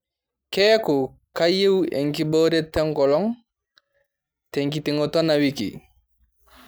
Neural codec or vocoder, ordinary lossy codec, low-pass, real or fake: none; none; none; real